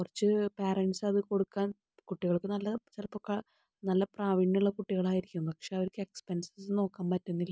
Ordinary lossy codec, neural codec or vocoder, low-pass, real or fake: none; none; none; real